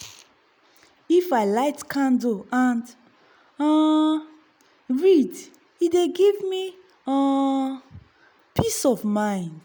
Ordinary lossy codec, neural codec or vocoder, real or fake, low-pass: none; none; real; none